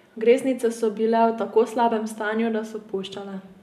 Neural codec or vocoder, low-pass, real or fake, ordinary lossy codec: none; 14.4 kHz; real; none